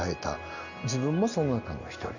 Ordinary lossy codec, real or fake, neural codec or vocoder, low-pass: none; real; none; 7.2 kHz